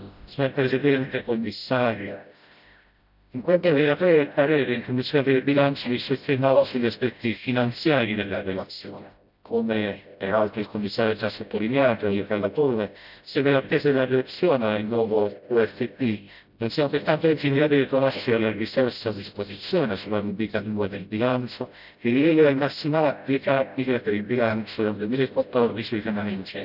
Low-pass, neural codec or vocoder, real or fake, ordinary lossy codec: 5.4 kHz; codec, 16 kHz, 0.5 kbps, FreqCodec, smaller model; fake; none